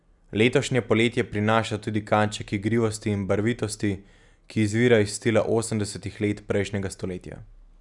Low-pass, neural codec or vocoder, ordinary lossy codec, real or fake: 10.8 kHz; none; none; real